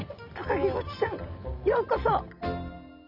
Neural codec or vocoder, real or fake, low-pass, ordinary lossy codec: none; real; 5.4 kHz; none